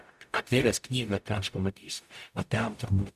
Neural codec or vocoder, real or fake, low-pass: codec, 44.1 kHz, 0.9 kbps, DAC; fake; 14.4 kHz